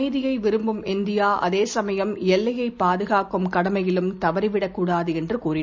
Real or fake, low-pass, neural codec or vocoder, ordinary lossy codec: real; none; none; none